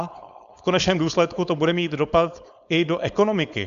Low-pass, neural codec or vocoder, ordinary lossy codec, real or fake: 7.2 kHz; codec, 16 kHz, 4.8 kbps, FACodec; Opus, 64 kbps; fake